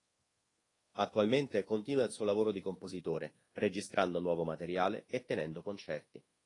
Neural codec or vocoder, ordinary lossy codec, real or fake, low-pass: codec, 24 kHz, 1.2 kbps, DualCodec; AAC, 32 kbps; fake; 10.8 kHz